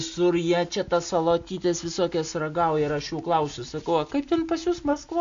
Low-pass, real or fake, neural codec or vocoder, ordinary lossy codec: 7.2 kHz; real; none; AAC, 64 kbps